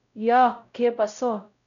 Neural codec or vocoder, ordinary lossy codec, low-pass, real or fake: codec, 16 kHz, 0.5 kbps, X-Codec, WavLM features, trained on Multilingual LibriSpeech; none; 7.2 kHz; fake